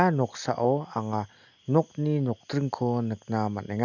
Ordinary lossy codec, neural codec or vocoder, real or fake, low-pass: none; none; real; 7.2 kHz